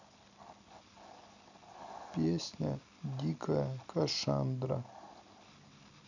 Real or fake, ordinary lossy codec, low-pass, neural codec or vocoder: real; none; 7.2 kHz; none